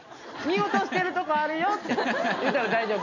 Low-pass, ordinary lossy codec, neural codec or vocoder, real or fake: 7.2 kHz; none; none; real